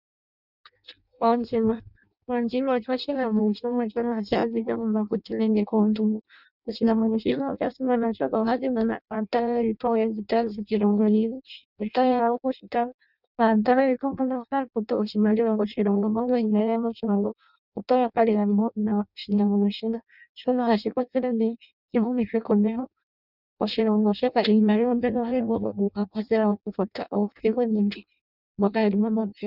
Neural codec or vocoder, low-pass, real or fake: codec, 16 kHz in and 24 kHz out, 0.6 kbps, FireRedTTS-2 codec; 5.4 kHz; fake